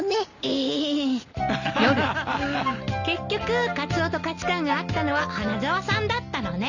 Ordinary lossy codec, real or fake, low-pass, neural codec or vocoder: none; real; 7.2 kHz; none